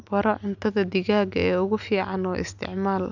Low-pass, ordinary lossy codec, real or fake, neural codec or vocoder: 7.2 kHz; none; real; none